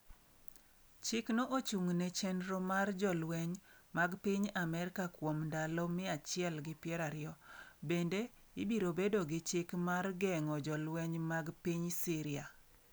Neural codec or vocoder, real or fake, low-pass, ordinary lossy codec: none; real; none; none